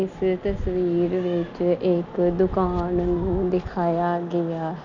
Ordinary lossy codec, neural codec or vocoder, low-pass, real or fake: none; none; 7.2 kHz; real